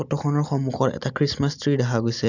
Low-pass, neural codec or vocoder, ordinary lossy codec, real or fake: 7.2 kHz; none; none; real